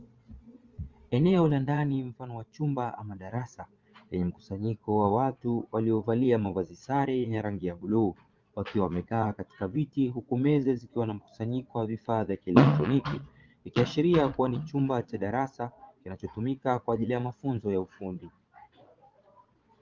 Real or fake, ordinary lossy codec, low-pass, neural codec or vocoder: fake; Opus, 32 kbps; 7.2 kHz; vocoder, 22.05 kHz, 80 mel bands, Vocos